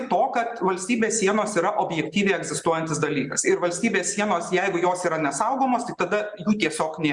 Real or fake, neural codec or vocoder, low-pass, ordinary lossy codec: real; none; 10.8 kHz; Opus, 64 kbps